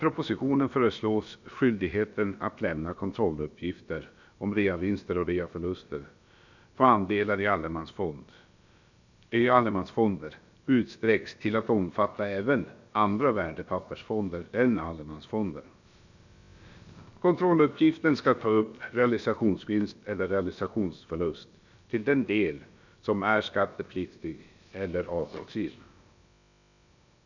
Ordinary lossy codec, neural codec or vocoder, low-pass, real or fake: none; codec, 16 kHz, about 1 kbps, DyCAST, with the encoder's durations; 7.2 kHz; fake